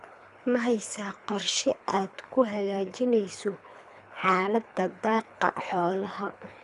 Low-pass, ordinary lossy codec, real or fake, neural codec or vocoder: 10.8 kHz; none; fake; codec, 24 kHz, 3 kbps, HILCodec